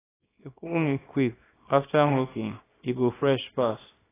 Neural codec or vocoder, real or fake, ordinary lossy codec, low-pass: codec, 24 kHz, 0.9 kbps, WavTokenizer, small release; fake; AAC, 16 kbps; 3.6 kHz